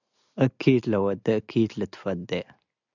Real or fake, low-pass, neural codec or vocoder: real; 7.2 kHz; none